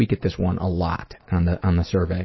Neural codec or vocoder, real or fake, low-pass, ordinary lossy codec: none; real; 7.2 kHz; MP3, 24 kbps